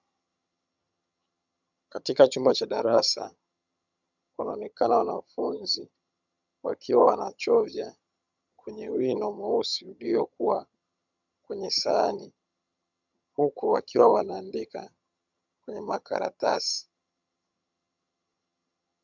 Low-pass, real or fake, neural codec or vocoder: 7.2 kHz; fake; vocoder, 22.05 kHz, 80 mel bands, HiFi-GAN